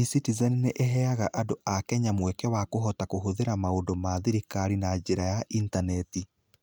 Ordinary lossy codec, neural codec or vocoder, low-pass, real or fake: none; none; none; real